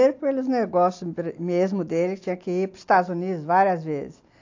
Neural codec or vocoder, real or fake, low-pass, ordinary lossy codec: none; real; 7.2 kHz; AAC, 48 kbps